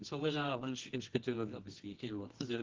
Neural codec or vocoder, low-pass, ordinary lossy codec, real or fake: codec, 24 kHz, 0.9 kbps, WavTokenizer, medium music audio release; 7.2 kHz; Opus, 16 kbps; fake